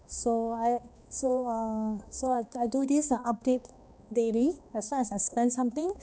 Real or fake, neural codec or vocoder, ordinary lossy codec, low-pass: fake; codec, 16 kHz, 2 kbps, X-Codec, HuBERT features, trained on balanced general audio; none; none